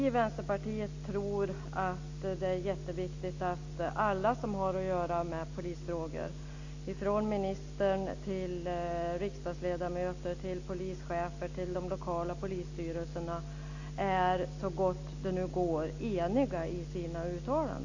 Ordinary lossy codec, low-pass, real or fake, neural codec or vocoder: AAC, 48 kbps; 7.2 kHz; real; none